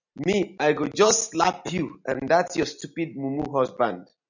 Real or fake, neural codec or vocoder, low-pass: real; none; 7.2 kHz